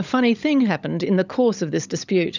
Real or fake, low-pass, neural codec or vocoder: fake; 7.2 kHz; codec, 16 kHz, 16 kbps, FunCodec, trained on LibriTTS, 50 frames a second